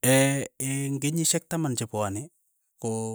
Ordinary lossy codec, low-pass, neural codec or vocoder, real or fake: none; none; none; real